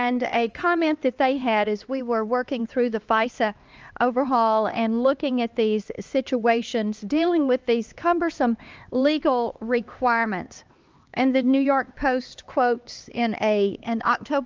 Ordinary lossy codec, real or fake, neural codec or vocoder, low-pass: Opus, 24 kbps; fake; codec, 16 kHz, 2 kbps, X-Codec, HuBERT features, trained on LibriSpeech; 7.2 kHz